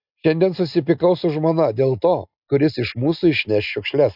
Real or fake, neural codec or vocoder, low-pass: real; none; 5.4 kHz